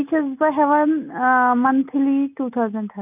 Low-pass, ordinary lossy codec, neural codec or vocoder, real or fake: 3.6 kHz; none; none; real